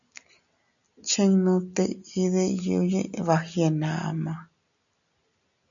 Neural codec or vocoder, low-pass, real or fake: none; 7.2 kHz; real